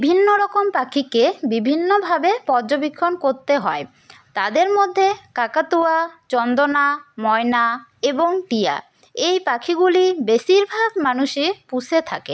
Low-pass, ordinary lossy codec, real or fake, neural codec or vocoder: none; none; real; none